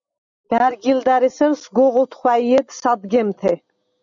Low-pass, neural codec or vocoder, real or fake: 7.2 kHz; none; real